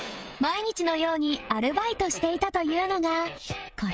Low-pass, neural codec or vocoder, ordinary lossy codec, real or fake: none; codec, 16 kHz, 16 kbps, FreqCodec, smaller model; none; fake